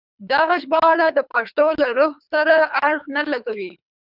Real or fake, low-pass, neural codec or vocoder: fake; 5.4 kHz; codec, 24 kHz, 3 kbps, HILCodec